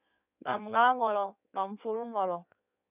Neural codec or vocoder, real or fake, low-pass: codec, 16 kHz in and 24 kHz out, 1.1 kbps, FireRedTTS-2 codec; fake; 3.6 kHz